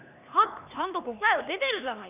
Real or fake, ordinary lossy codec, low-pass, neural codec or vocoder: fake; none; 3.6 kHz; codec, 16 kHz, 2 kbps, X-Codec, HuBERT features, trained on LibriSpeech